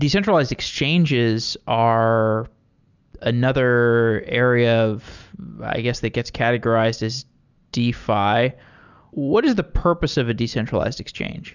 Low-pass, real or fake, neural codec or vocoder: 7.2 kHz; real; none